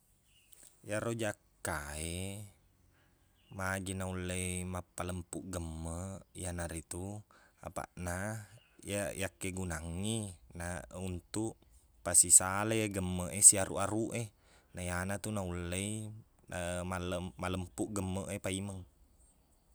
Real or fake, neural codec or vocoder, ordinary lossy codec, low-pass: real; none; none; none